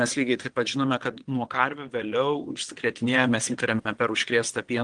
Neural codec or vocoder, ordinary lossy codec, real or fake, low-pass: vocoder, 22.05 kHz, 80 mel bands, WaveNeXt; Opus, 24 kbps; fake; 9.9 kHz